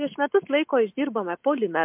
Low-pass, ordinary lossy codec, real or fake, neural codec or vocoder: 3.6 kHz; MP3, 32 kbps; real; none